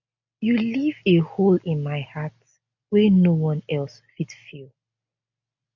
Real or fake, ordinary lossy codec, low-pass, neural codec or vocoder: real; none; 7.2 kHz; none